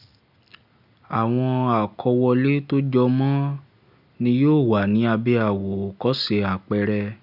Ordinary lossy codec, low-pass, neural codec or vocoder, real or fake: none; 5.4 kHz; none; real